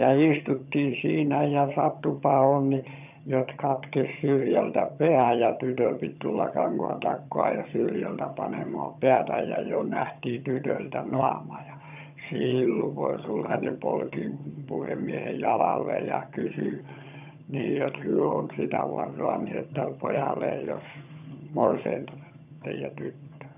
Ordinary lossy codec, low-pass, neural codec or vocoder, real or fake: none; 3.6 kHz; vocoder, 22.05 kHz, 80 mel bands, HiFi-GAN; fake